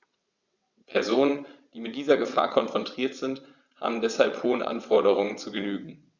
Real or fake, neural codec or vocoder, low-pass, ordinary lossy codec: fake; vocoder, 22.05 kHz, 80 mel bands, WaveNeXt; 7.2 kHz; Opus, 64 kbps